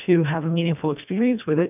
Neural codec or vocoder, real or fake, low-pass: codec, 24 kHz, 1.5 kbps, HILCodec; fake; 3.6 kHz